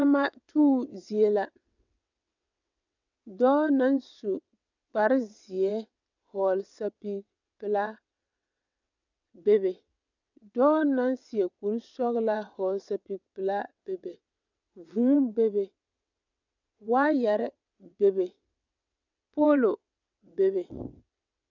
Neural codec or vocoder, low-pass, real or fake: vocoder, 22.05 kHz, 80 mel bands, WaveNeXt; 7.2 kHz; fake